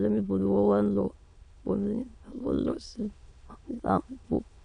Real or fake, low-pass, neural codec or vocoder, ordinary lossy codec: fake; 9.9 kHz; autoencoder, 22.05 kHz, a latent of 192 numbers a frame, VITS, trained on many speakers; none